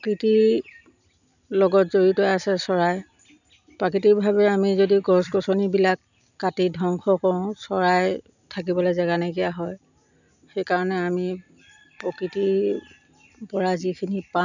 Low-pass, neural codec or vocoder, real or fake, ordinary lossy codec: 7.2 kHz; none; real; none